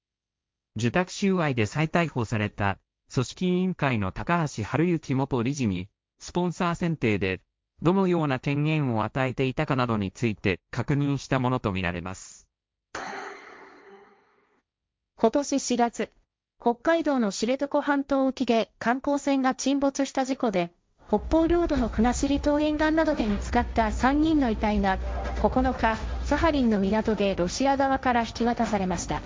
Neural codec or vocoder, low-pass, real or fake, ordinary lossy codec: codec, 16 kHz, 1.1 kbps, Voila-Tokenizer; none; fake; none